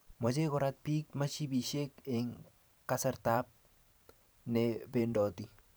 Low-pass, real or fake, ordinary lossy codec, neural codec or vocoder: none; fake; none; vocoder, 44.1 kHz, 128 mel bands every 512 samples, BigVGAN v2